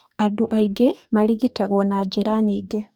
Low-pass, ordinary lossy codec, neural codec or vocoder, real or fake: none; none; codec, 44.1 kHz, 2.6 kbps, DAC; fake